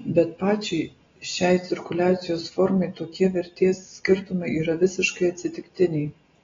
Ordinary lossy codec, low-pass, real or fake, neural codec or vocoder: AAC, 24 kbps; 7.2 kHz; real; none